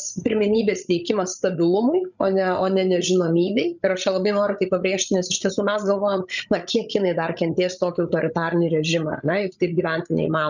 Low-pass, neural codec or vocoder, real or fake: 7.2 kHz; codec, 16 kHz, 16 kbps, FreqCodec, larger model; fake